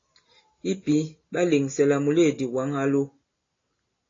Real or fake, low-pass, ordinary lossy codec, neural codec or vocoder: real; 7.2 kHz; AAC, 32 kbps; none